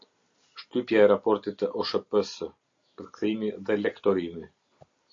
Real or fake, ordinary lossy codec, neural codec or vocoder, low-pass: real; AAC, 48 kbps; none; 7.2 kHz